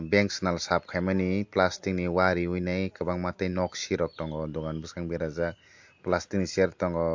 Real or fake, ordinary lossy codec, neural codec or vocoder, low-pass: real; MP3, 48 kbps; none; 7.2 kHz